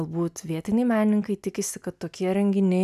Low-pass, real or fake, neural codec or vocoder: 14.4 kHz; real; none